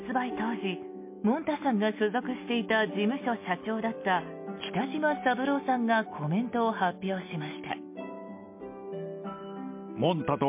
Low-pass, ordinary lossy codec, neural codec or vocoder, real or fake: 3.6 kHz; MP3, 24 kbps; none; real